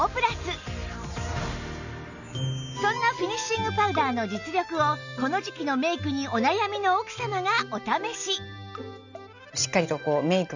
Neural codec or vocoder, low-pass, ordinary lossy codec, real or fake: none; 7.2 kHz; none; real